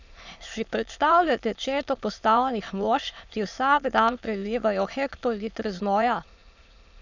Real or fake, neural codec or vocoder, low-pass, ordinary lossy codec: fake; autoencoder, 22.05 kHz, a latent of 192 numbers a frame, VITS, trained on many speakers; 7.2 kHz; none